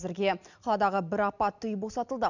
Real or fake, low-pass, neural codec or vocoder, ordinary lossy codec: real; 7.2 kHz; none; none